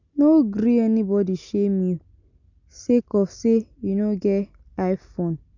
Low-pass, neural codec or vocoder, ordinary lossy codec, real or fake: 7.2 kHz; none; none; real